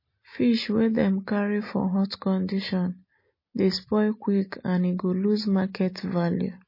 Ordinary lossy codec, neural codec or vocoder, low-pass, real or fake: MP3, 24 kbps; none; 5.4 kHz; real